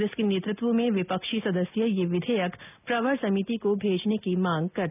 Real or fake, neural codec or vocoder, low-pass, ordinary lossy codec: real; none; 3.6 kHz; none